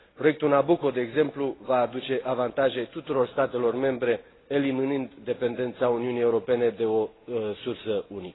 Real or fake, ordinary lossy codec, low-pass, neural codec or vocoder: real; AAC, 16 kbps; 7.2 kHz; none